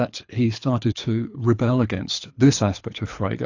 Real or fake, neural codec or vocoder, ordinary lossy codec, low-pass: fake; codec, 24 kHz, 6 kbps, HILCodec; AAC, 48 kbps; 7.2 kHz